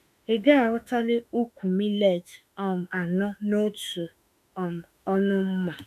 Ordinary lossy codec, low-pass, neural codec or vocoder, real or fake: none; 14.4 kHz; autoencoder, 48 kHz, 32 numbers a frame, DAC-VAE, trained on Japanese speech; fake